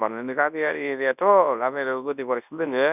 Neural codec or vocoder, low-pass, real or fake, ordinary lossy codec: codec, 24 kHz, 0.9 kbps, WavTokenizer, large speech release; 3.6 kHz; fake; none